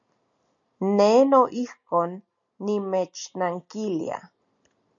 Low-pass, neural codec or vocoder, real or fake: 7.2 kHz; none; real